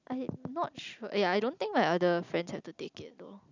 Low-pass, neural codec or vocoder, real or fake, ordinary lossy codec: 7.2 kHz; none; real; none